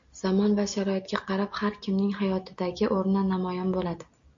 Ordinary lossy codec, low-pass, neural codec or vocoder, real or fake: AAC, 64 kbps; 7.2 kHz; none; real